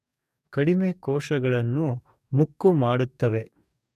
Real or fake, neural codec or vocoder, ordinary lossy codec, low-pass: fake; codec, 44.1 kHz, 2.6 kbps, DAC; none; 14.4 kHz